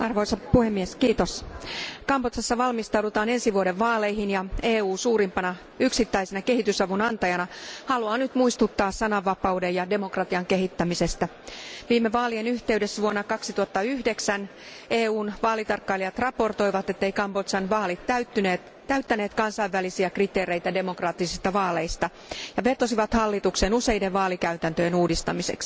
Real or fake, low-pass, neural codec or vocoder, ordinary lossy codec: real; none; none; none